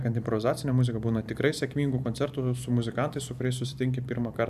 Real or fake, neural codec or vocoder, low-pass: fake; vocoder, 44.1 kHz, 128 mel bands every 512 samples, BigVGAN v2; 14.4 kHz